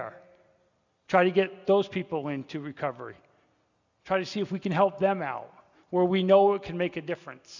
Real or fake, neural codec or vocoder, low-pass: real; none; 7.2 kHz